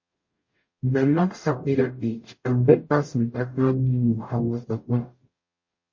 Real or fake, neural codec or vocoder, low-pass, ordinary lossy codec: fake; codec, 44.1 kHz, 0.9 kbps, DAC; 7.2 kHz; MP3, 32 kbps